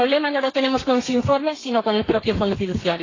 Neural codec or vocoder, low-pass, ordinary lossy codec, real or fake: codec, 32 kHz, 1.9 kbps, SNAC; 7.2 kHz; AAC, 32 kbps; fake